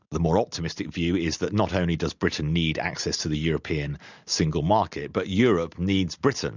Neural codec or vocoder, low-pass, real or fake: none; 7.2 kHz; real